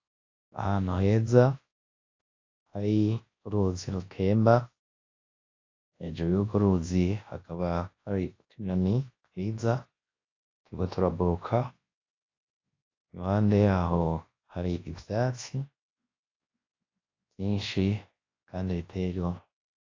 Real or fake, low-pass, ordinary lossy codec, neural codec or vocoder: fake; 7.2 kHz; AAC, 32 kbps; codec, 24 kHz, 0.9 kbps, WavTokenizer, large speech release